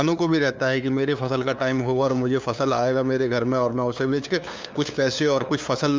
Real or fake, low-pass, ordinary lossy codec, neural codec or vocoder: fake; none; none; codec, 16 kHz, 2 kbps, FunCodec, trained on LibriTTS, 25 frames a second